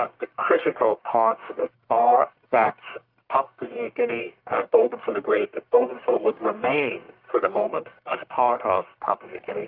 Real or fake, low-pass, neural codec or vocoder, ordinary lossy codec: fake; 5.4 kHz; codec, 44.1 kHz, 1.7 kbps, Pupu-Codec; Opus, 24 kbps